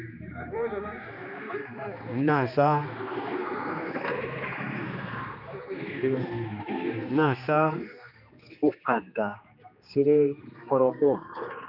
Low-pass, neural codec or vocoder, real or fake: 5.4 kHz; codec, 16 kHz, 2 kbps, X-Codec, HuBERT features, trained on general audio; fake